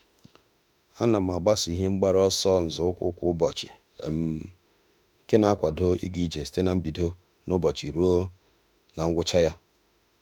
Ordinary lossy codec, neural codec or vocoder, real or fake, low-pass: none; autoencoder, 48 kHz, 32 numbers a frame, DAC-VAE, trained on Japanese speech; fake; 19.8 kHz